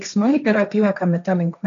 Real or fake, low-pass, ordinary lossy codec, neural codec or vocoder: fake; 7.2 kHz; MP3, 96 kbps; codec, 16 kHz, 1.1 kbps, Voila-Tokenizer